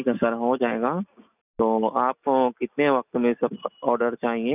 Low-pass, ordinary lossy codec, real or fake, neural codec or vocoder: 3.6 kHz; none; real; none